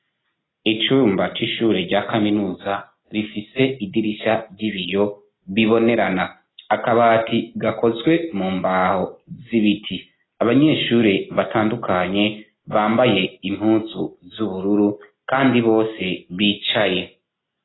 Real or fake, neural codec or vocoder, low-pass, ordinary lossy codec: real; none; 7.2 kHz; AAC, 16 kbps